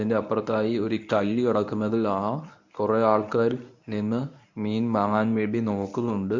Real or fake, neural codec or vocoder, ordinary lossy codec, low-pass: fake; codec, 24 kHz, 0.9 kbps, WavTokenizer, medium speech release version 1; MP3, 64 kbps; 7.2 kHz